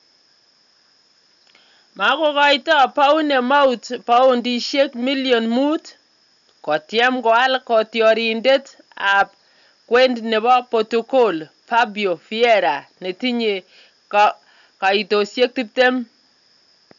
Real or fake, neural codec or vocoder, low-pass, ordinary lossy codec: real; none; 7.2 kHz; none